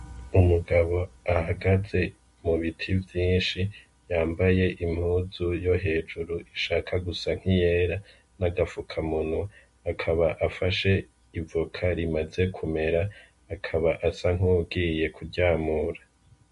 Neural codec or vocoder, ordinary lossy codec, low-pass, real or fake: none; MP3, 48 kbps; 14.4 kHz; real